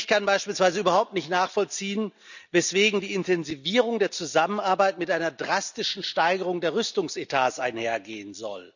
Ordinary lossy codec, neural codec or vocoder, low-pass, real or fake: none; none; 7.2 kHz; real